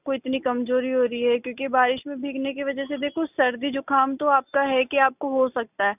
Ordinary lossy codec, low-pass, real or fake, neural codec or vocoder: none; 3.6 kHz; real; none